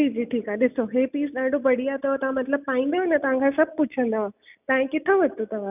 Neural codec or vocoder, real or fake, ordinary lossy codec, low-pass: none; real; none; 3.6 kHz